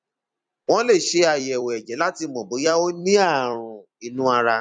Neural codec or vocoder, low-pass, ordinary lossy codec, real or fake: vocoder, 44.1 kHz, 128 mel bands every 256 samples, BigVGAN v2; 9.9 kHz; none; fake